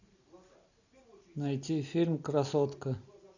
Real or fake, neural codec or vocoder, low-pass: real; none; 7.2 kHz